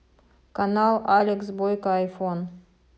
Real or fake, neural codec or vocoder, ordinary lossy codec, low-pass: real; none; none; none